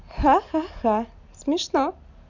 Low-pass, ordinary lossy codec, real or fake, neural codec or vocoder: 7.2 kHz; none; real; none